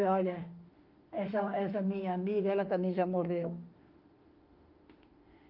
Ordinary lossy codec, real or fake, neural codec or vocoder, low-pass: Opus, 32 kbps; fake; autoencoder, 48 kHz, 32 numbers a frame, DAC-VAE, trained on Japanese speech; 5.4 kHz